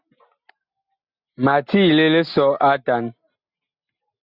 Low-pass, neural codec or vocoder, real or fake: 5.4 kHz; none; real